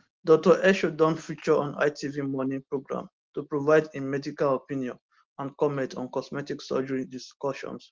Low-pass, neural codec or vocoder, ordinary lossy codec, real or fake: 7.2 kHz; none; Opus, 16 kbps; real